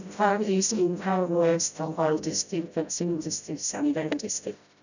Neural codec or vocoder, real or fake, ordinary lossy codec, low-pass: codec, 16 kHz, 0.5 kbps, FreqCodec, smaller model; fake; none; 7.2 kHz